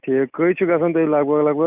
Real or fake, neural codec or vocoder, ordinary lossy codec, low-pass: real; none; none; 3.6 kHz